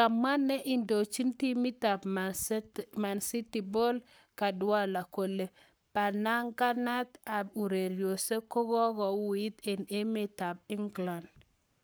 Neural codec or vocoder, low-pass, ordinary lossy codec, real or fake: codec, 44.1 kHz, 7.8 kbps, Pupu-Codec; none; none; fake